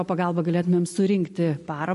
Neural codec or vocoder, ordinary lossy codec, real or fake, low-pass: none; MP3, 48 kbps; real; 14.4 kHz